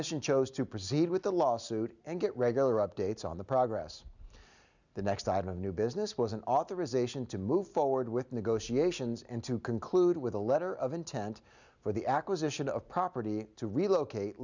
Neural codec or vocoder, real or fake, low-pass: none; real; 7.2 kHz